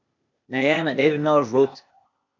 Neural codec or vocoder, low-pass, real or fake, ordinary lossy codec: codec, 16 kHz, 0.8 kbps, ZipCodec; 7.2 kHz; fake; MP3, 48 kbps